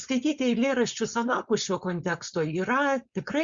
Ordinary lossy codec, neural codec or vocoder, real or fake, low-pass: Opus, 64 kbps; codec, 16 kHz, 4.8 kbps, FACodec; fake; 7.2 kHz